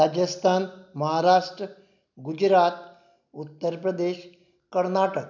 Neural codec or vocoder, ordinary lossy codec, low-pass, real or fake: none; none; 7.2 kHz; real